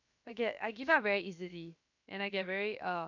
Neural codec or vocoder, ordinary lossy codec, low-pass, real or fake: codec, 16 kHz, 0.7 kbps, FocalCodec; none; 7.2 kHz; fake